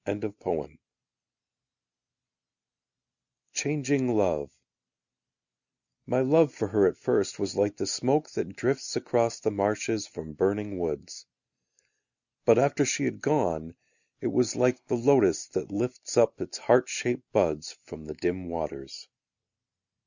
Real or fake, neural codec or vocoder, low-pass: real; none; 7.2 kHz